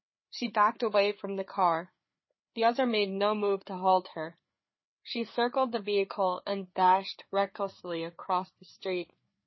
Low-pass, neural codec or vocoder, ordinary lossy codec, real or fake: 7.2 kHz; codec, 16 kHz, 4 kbps, FreqCodec, larger model; MP3, 24 kbps; fake